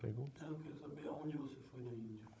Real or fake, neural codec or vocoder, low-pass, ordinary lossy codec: fake; codec, 16 kHz, 16 kbps, FunCodec, trained on Chinese and English, 50 frames a second; none; none